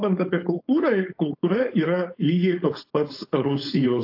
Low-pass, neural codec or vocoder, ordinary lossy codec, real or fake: 5.4 kHz; codec, 16 kHz, 4.8 kbps, FACodec; AAC, 32 kbps; fake